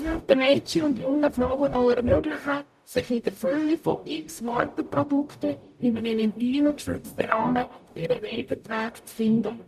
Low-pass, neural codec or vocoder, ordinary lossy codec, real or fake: 14.4 kHz; codec, 44.1 kHz, 0.9 kbps, DAC; none; fake